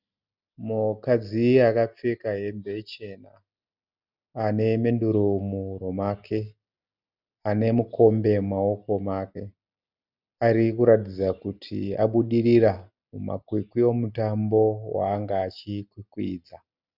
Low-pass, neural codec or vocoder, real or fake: 5.4 kHz; none; real